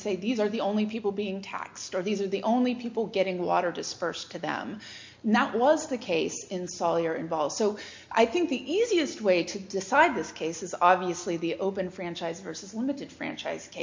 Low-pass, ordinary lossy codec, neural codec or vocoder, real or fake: 7.2 kHz; MP3, 48 kbps; none; real